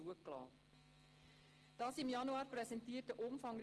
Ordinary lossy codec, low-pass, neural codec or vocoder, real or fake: Opus, 16 kbps; 10.8 kHz; none; real